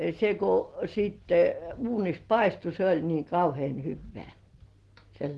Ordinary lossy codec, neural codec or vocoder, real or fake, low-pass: Opus, 16 kbps; none; real; 10.8 kHz